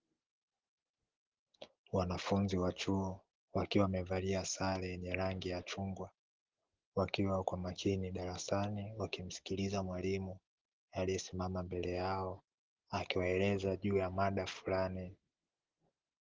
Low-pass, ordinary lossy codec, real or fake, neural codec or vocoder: 7.2 kHz; Opus, 16 kbps; real; none